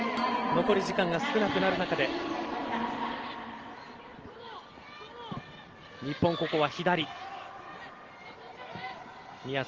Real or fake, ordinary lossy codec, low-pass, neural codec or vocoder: real; Opus, 16 kbps; 7.2 kHz; none